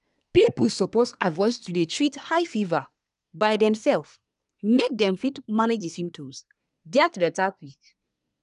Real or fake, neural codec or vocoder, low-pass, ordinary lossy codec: fake; codec, 24 kHz, 1 kbps, SNAC; 10.8 kHz; none